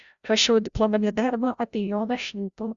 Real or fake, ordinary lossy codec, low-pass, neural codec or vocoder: fake; Opus, 64 kbps; 7.2 kHz; codec, 16 kHz, 0.5 kbps, FreqCodec, larger model